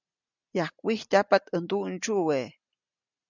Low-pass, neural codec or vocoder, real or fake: 7.2 kHz; none; real